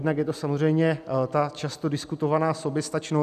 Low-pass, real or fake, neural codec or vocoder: 14.4 kHz; real; none